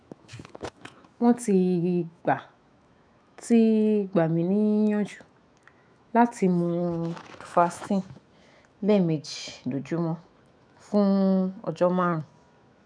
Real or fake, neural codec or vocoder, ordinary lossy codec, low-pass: fake; autoencoder, 48 kHz, 128 numbers a frame, DAC-VAE, trained on Japanese speech; none; 9.9 kHz